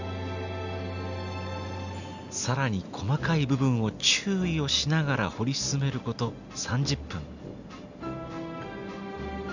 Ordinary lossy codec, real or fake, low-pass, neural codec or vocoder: none; real; 7.2 kHz; none